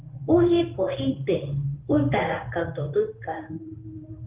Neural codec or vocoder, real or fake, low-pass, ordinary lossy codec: codec, 16 kHz in and 24 kHz out, 1 kbps, XY-Tokenizer; fake; 3.6 kHz; Opus, 32 kbps